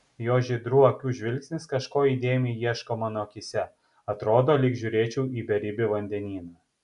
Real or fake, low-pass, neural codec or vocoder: real; 10.8 kHz; none